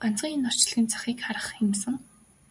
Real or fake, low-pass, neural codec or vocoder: fake; 10.8 kHz; vocoder, 44.1 kHz, 128 mel bands every 512 samples, BigVGAN v2